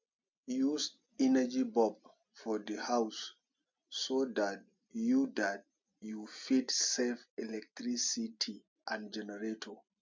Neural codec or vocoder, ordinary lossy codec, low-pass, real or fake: none; MP3, 64 kbps; 7.2 kHz; real